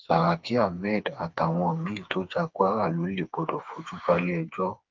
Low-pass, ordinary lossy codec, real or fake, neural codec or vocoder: 7.2 kHz; Opus, 24 kbps; fake; codec, 16 kHz, 4 kbps, FreqCodec, smaller model